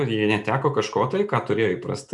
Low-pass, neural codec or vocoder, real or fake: 10.8 kHz; none; real